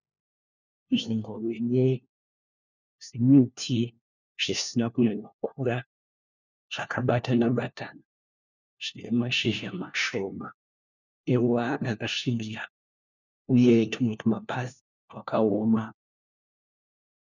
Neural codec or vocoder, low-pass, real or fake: codec, 16 kHz, 1 kbps, FunCodec, trained on LibriTTS, 50 frames a second; 7.2 kHz; fake